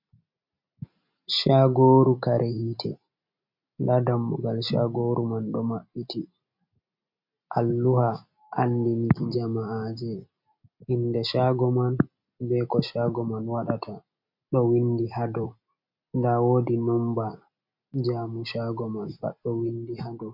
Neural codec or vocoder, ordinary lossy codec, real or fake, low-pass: none; MP3, 48 kbps; real; 5.4 kHz